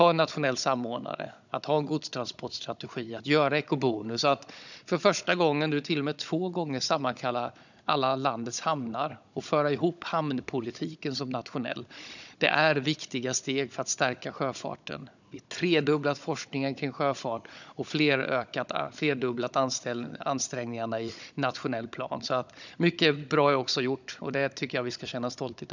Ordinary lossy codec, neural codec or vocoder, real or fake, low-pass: none; codec, 16 kHz, 16 kbps, FunCodec, trained on Chinese and English, 50 frames a second; fake; 7.2 kHz